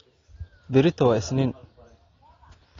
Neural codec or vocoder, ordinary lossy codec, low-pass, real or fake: none; AAC, 32 kbps; 7.2 kHz; real